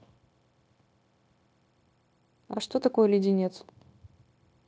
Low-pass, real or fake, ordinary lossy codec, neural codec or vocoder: none; fake; none; codec, 16 kHz, 0.9 kbps, LongCat-Audio-Codec